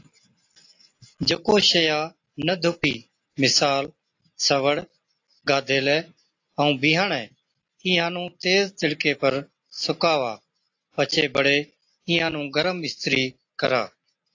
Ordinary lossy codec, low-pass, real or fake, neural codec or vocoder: AAC, 48 kbps; 7.2 kHz; real; none